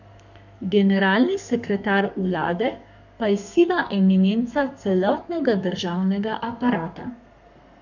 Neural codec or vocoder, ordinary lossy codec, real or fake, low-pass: codec, 32 kHz, 1.9 kbps, SNAC; none; fake; 7.2 kHz